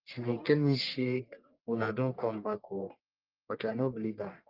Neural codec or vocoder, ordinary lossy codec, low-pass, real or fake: codec, 44.1 kHz, 1.7 kbps, Pupu-Codec; Opus, 24 kbps; 5.4 kHz; fake